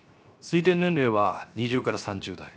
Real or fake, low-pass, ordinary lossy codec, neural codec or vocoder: fake; none; none; codec, 16 kHz, 0.7 kbps, FocalCodec